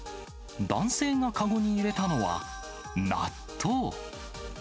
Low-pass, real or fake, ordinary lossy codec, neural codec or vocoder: none; real; none; none